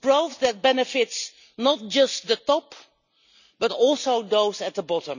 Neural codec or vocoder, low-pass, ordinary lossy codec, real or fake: none; 7.2 kHz; none; real